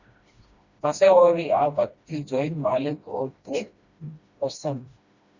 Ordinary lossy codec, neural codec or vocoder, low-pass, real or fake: Opus, 64 kbps; codec, 16 kHz, 1 kbps, FreqCodec, smaller model; 7.2 kHz; fake